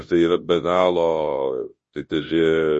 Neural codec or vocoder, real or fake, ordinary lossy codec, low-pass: codec, 24 kHz, 0.9 kbps, WavTokenizer, large speech release; fake; MP3, 32 kbps; 10.8 kHz